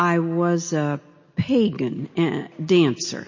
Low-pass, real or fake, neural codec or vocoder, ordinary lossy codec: 7.2 kHz; real; none; MP3, 32 kbps